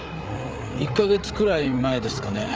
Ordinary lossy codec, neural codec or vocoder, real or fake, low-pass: none; codec, 16 kHz, 8 kbps, FreqCodec, larger model; fake; none